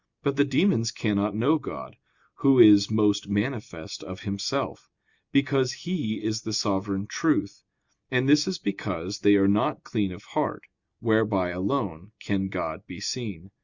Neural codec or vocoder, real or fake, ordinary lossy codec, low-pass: none; real; Opus, 64 kbps; 7.2 kHz